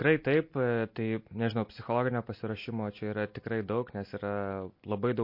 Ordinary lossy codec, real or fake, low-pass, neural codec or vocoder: MP3, 32 kbps; real; 5.4 kHz; none